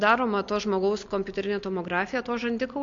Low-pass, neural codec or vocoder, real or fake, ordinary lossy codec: 7.2 kHz; none; real; MP3, 48 kbps